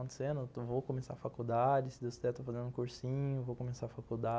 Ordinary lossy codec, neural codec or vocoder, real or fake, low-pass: none; none; real; none